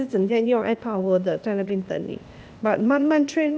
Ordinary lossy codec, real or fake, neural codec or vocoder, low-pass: none; fake; codec, 16 kHz, 0.8 kbps, ZipCodec; none